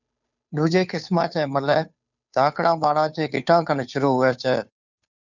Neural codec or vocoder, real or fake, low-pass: codec, 16 kHz, 2 kbps, FunCodec, trained on Chinese and English, 25 frames a second; fake; 7.2 kHz